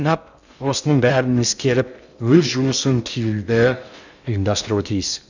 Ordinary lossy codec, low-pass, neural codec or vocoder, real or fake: none; 7.2 kHz; codec, 16 kHz in and 24 kHz out, 0.6 kbps, FocalCodec, streaming, 2048 codes; fake